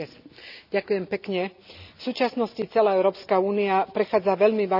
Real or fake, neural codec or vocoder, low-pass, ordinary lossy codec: real; none; 5.4 kHz; none